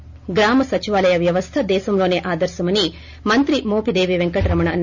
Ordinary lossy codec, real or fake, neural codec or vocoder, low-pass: none; real; none; 7.2 kHz